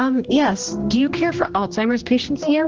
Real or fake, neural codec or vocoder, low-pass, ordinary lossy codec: fake; codec, 16 kHz, 1 kbps, X-Codec, HuBERT features, trained on general audio; 7.2 kHz; Opus, 16 kbps